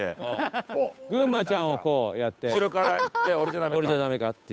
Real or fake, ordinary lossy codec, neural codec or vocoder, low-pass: fake; none; codec, 16 kHz, 8 kbps, FunCodec, trained on Chinese and English, 25 frames a second; none